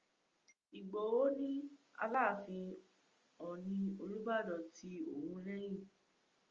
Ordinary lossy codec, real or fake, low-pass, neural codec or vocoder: Opus, 32 kbps; real; 7.2 kHz; none